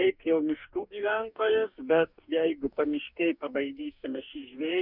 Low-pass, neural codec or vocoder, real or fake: 5.4 kHz; codec, 44.1 kHz, 2.6 kbps, DAC; fake